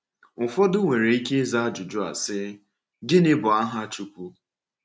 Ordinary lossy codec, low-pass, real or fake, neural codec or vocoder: none; none; real; none